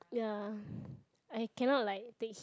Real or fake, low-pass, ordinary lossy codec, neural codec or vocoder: real; none; none; none